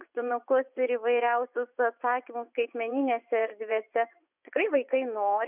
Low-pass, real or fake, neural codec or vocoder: 3.6 kHz; fake; vocoder, 24 kHz, 100 mel bands, Vocos